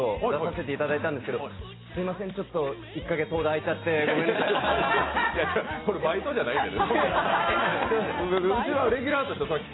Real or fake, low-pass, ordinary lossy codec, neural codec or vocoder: real; 7.2 kHz; AAC, 16 kbps; none